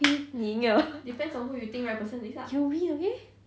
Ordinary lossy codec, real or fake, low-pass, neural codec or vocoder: none; real; none; none